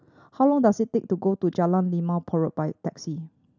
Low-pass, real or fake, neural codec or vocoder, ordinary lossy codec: 7.2 kHz; real; none; none